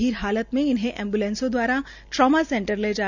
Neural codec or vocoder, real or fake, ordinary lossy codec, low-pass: none; real; none; 7.2 kHz